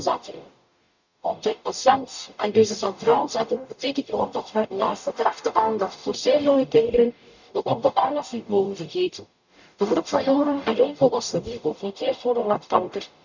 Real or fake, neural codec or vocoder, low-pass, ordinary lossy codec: fake; codec, 44.1 kHz, 0.9 kbps, DAC; 7.2 kHz; none